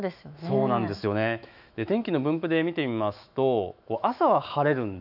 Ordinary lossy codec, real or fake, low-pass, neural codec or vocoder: none; fake; 5.4 kHz; autoencoder, 48 kHz, 128 numbers a frame, DAC-VAE, trained on Japanese speech